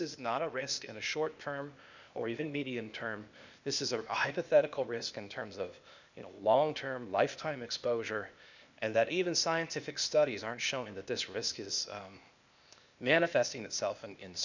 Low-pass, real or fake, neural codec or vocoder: 7.2 kHz; fake; codec, 16 kHz, 0.8 kbps, ZipCodec